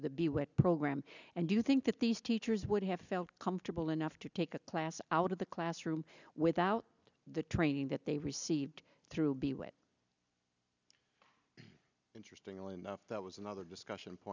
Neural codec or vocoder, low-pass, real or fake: none; 7.2 kHz; real